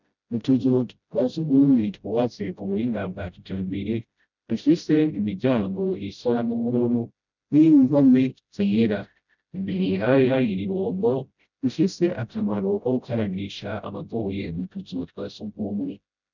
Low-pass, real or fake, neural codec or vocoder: 7.2 kHz; fake; codec, 16 kHz, 0.5 kbps, FreqCodec, smaller model